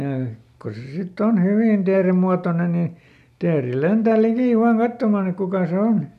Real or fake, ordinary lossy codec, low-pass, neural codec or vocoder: real; none; 14.4 kHz; none